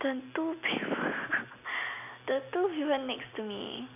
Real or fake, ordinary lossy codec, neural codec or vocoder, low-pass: real; none; none; 3.6 kHz